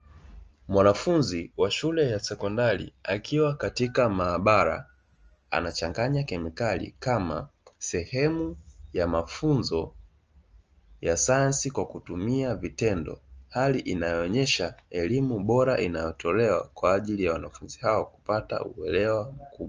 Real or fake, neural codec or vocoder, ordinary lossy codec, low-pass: real; none; Opus, 24 kbps; 7.2 kHz